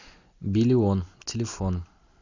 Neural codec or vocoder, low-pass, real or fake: none; 7.2 kHz; real